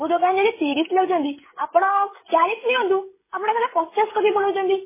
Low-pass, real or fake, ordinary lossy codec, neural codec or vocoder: 3.6 kHz; fake; MP3, 16 kbps; codec, 16 kHz, 16 kbps, FreqCodec, smaller model